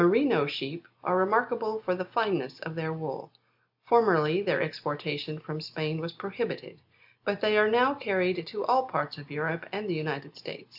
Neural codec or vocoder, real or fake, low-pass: none; real; 5.4 kHz